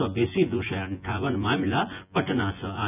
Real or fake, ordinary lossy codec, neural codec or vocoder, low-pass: fake; none; vocoder, 24 kHz, 100 mel bands, Vocos; 3.6 kHz